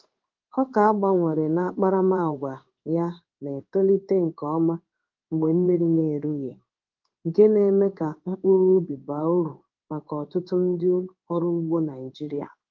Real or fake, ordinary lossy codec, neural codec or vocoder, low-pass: fake; Opus, 32 kbps; codec, 16 kHz in and 24 kHz out, 1 kbps, XY-Tokenizer; 7.2 kHz